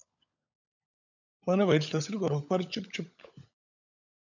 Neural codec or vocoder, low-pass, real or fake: codec, 16 kHz, 16 kbps, FunCodec, trained on LibriTTS, 50 frames a second; 7.2 kHz; fake